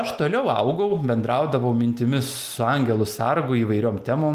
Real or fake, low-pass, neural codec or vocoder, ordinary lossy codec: real; 14.4 kHz; none; Opus, 32 kbps